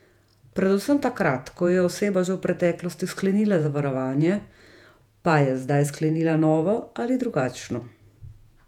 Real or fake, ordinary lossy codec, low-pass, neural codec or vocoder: fake; none; 19.8 kHz; vocoder, 48 kHz, 128 mel bands, Vocos